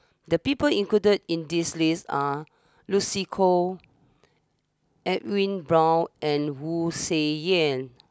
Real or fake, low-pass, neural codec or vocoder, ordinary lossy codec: real; none; none; none